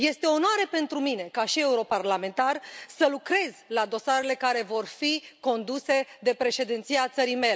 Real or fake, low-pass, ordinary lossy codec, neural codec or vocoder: real; none; none; none